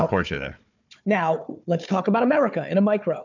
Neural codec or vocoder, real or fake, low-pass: codec, 16 kHz, 4 kbps, FunCodec, trained on Chinese and English, 50 frames a second; fake; 7.2 kHz